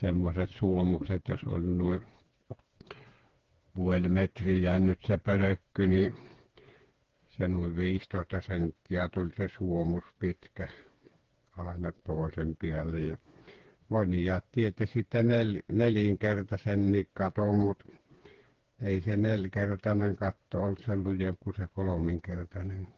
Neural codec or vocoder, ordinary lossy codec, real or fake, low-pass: codec, 16 kHz, 4 kbps, FreqCodec, smaller model; Opus, 16 kbps; fake; 7.2 kHz